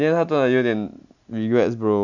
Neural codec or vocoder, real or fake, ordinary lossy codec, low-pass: none; real; none; 7.2 kHz